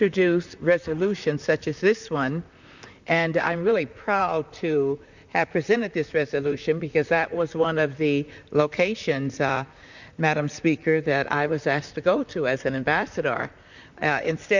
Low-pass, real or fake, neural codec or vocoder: 7.2 kHz; fake; vocoder, 44.1 kHz, 128 mel bands, Pupu-Vocoder